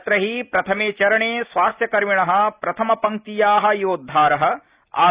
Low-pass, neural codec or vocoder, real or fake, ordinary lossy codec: 3.6 kHz; none; real; Opus, 64 kbps